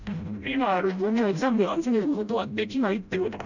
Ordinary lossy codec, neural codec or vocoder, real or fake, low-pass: none; codec, 16 kHz, 0.5 kbps, FreqCodec, smaller model; fake; 7.2 kHz